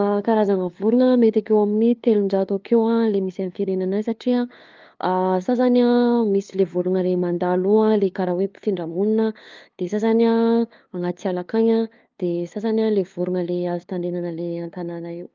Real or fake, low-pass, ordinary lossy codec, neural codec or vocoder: fake; 7.2 kHz; Opus, 24 kbps; codec, 16 kHz, 2 kbps, FunCodec, trained on LibriTTS, 25 frames a second